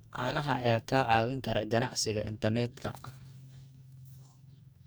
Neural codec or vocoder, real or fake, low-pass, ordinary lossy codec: codec, 44.1 kHz, 2.6 kbps, DAC; fake; none; none